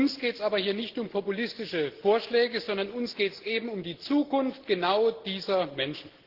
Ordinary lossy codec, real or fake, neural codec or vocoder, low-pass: Opus, 16 kbps; real; none; 5.4 kHz